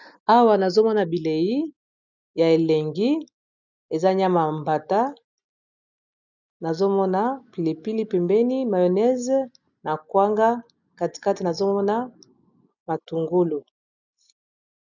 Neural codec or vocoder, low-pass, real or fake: none; 7.2 kHz; real